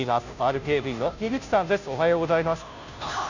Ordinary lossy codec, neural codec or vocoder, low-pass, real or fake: none; codec, 16 kHz, 0.5 kbps, FunCodec, trained on Chinese and English, 25 frames a second; 7.2 kHz; fake